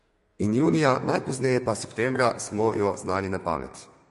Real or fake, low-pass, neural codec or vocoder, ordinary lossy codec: fake; 14.4 kHz; codec, 32 kHz, 1.9 kbps, SNAC; MP3, 48 kbps